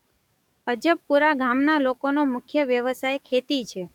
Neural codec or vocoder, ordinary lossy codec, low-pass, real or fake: codec, 44.1 kHz, 7.8 kbps, DAC; none; 19.8 kHz; fake